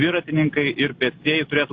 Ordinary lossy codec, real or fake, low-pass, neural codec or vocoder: AAC, 32 kbps; real; 7.2 kHz; none